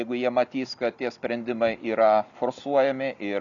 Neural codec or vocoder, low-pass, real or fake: none; 7.2 kHz; real